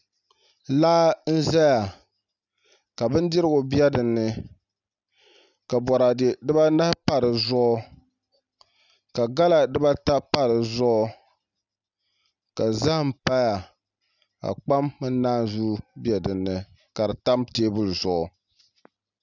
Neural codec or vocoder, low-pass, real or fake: none; 7.2 kHz; real